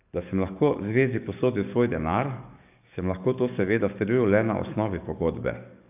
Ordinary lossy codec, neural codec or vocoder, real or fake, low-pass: AAC, 32 kbps; codec, 44.1 kHz, 7.8 kbps, DAC; fake; 3.6 kHz